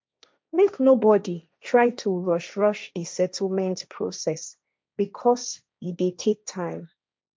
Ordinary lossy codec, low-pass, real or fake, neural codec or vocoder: none; none; fake; codec, 16 kHz, 1.1 kbps, Voila-Tokenizer